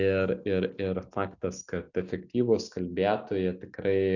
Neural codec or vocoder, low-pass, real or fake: none; 7.2 kHz; real